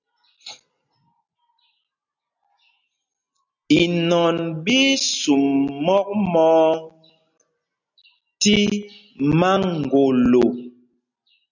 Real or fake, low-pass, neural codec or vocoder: real; 7.2 kHz; none